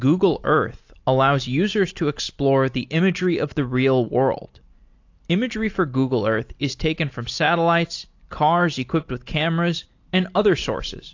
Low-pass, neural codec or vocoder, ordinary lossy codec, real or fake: 7.2 kHz; none; AAC, 48 kbps; real